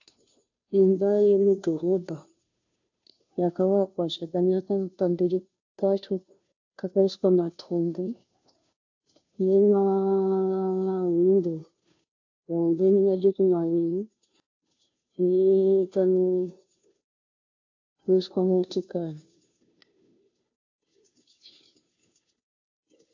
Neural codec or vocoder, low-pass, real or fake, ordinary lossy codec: codec, 16 kHz, 0.5 kbps, FunCodec, trained on Chinese and English, 25 frames a second; 7.2 kHz; fake; AAC, 48 kbps